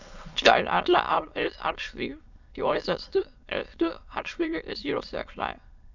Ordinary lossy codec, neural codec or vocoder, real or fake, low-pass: none; autoencoder, 22.05 kHz, a latent of 192 numbers a frame, VITS, trained on many speakers; fake; 7.2 kHz